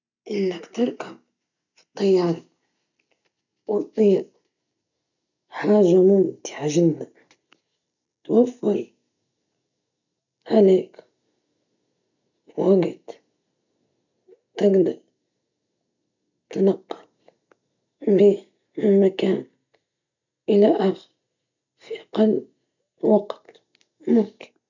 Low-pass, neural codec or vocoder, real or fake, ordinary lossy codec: 7.2 kHz; none; real; none